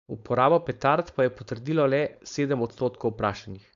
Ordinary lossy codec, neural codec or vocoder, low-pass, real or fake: AAC, 64 kbps; codec, 16 kHz, 4.8 kbps, FACodec; 7.2 kHz; fake